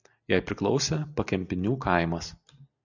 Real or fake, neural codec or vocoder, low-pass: real; none; 7.2 kHz